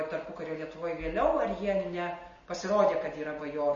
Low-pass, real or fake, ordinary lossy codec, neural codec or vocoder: 7.2 kHz; real; MP3, 32 kbps; none